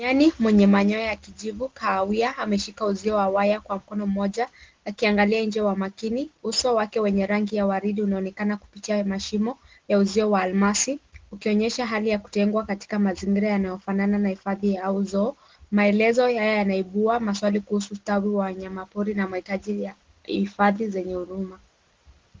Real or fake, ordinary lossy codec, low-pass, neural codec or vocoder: real; Opus, 16 kbps; 7.2 kHz; none